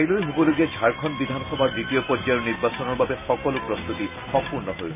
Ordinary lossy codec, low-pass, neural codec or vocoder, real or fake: none; 3.6 kHz; none; real